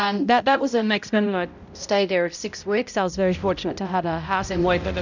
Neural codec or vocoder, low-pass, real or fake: codec, 16 kHz, 0.5 kbps, X-Codec, HuBERT features, trained on balanced general audio; 7.2 kHz; fake